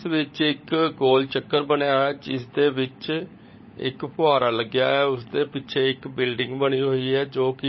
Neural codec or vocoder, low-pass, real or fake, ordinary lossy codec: codec, 16 kHz, 16 kbps, FunCodec, trained on LibriTTS, 50 frames a second; 7.2 kHz; fake; MP3, 24 kbps